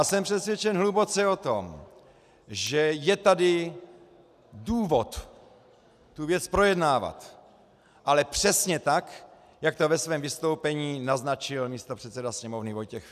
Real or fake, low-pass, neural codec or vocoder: real; 14.4 kHz; none